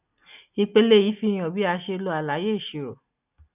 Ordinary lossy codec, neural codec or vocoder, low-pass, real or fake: none; none; 3.6 kHz; real